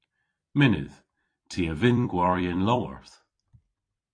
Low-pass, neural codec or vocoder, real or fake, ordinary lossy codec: 9.9 kHz; vocoder, 44.1 kHz, 128 mel bands every 256 samples, BigVGAN v2; fake; AAC, 48 kbps